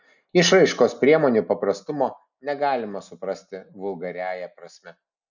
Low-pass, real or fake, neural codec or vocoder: 7.2 kHz; real; none